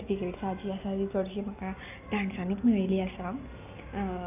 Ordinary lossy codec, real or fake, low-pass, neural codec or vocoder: none; real; 3.6 kHz; none